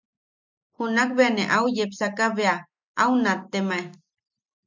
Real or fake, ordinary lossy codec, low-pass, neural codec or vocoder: real; MP3, 64 kbps; 7.2 kHz; none